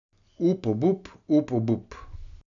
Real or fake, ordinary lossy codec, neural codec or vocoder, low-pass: real; none; none; 7.2 kHz